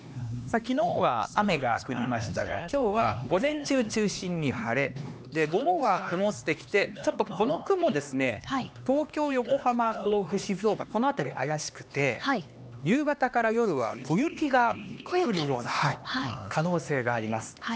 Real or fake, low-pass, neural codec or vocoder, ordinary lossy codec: fake; none; codec, 16 kHz, 2 kbps, X-Codec, HuBERT features, trained on LibriSpeech; none